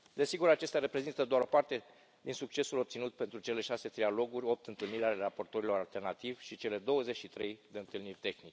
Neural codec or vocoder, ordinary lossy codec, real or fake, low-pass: none; none; real; none